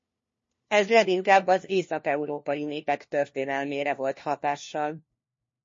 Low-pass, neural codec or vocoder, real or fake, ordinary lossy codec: 7.2 kHz; codec, 16 kHz, 1 kbps, FunCodec, trained on LibriTTS, 50 frames a second; fake; MP3, 32 kbps